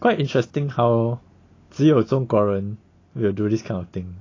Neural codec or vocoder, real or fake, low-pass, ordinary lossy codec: none; real; 7.2 kHz; AAC, 32 kbps